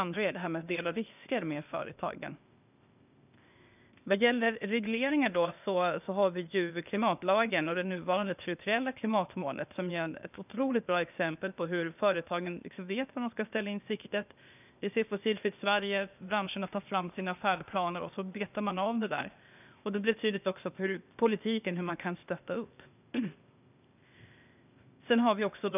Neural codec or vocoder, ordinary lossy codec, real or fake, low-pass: codec, 16 kHz, 0.8 kbps, ZipCodec; none; fake; 3.6 kHz